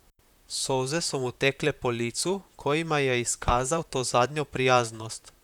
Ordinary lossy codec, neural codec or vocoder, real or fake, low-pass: none; vocoder, 44.1 kHz, 128 mel bands, Pupu-Vocoder; fake; 19.8 kHz